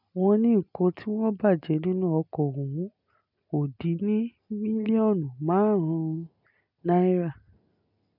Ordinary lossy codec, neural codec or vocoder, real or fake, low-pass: none; none; real; 5.4 kHz